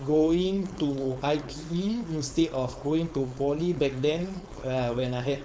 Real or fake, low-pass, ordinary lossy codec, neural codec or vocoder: fake; none; none; codec, 16 kHz, 4.8 kbps, FACodec